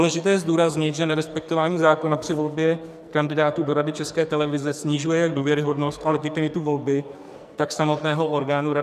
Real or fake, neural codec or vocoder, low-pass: fake; codec, 44.1 kHz, 2.6 kbps, SNAC; 14.4 kHz